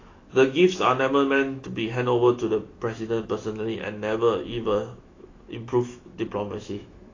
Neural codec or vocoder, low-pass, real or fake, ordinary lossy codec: autoencoder, 48 kHz, 128 numbers a frame, DAC-VAE, trained on Japanese speech; 7.2 kHz; fake; AAC, 32 kbps